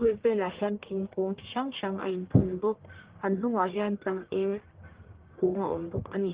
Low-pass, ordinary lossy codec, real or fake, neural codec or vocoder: 3.6 kHz; Opus, 16 kbps; fake; codec, 44.1 kHz, 1.7 kbps, Pupu-Codec